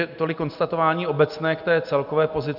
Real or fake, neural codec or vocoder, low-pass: real; none; 5.4 kHz